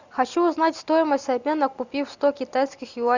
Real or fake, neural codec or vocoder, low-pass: real; none; 7.2 kHz